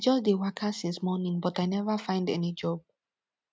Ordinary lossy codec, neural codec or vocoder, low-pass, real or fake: none; none; none; real